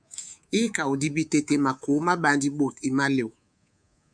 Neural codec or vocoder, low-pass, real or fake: codec, 24 kHz, 3.1 kbps, DualCodec; 9.9 kHz; fake